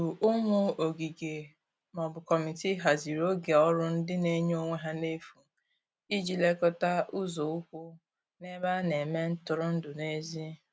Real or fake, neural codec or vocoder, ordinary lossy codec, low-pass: real; none; none; none